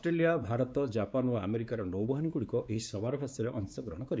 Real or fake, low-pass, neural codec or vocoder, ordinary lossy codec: fake; none; codec, 16 kHz, 4 kbps, X-Codec, WavLM features, trained on Multilingual LibriSpeech; none